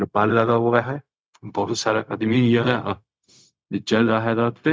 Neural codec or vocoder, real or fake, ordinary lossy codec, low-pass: codec, 16 kHz, 0.4 kbps, LongCat-Audio-Codec; fake; none; none